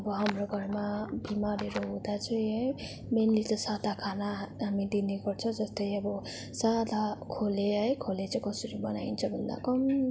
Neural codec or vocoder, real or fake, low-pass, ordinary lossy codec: none; real; none; none